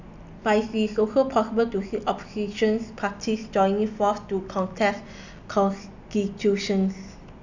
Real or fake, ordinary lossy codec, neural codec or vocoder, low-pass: real; none; none; 7.2 kHz